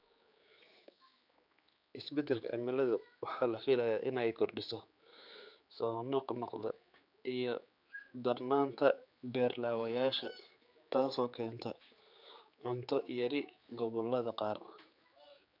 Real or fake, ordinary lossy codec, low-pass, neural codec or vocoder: fake; none; 5.4 kHz; codec, 16 kHz, 4 kbps, X-Codec, HuBERT features, trained on general audio